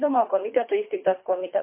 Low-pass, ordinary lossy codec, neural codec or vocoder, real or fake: 3.6 kHz; MP3, 24 kbps; codec, 24 kHz, 3 kbps, HILCodec; fake